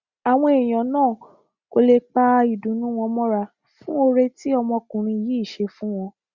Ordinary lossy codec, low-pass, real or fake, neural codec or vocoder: Opus, 64 kbps; 7.2 kHz; real; none